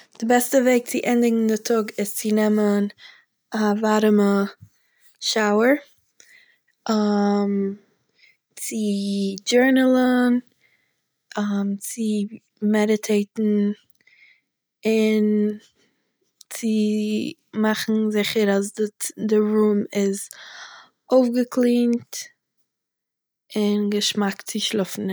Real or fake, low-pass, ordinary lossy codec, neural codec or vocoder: real; none; none; none